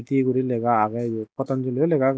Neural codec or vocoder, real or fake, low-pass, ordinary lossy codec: none; real; none; none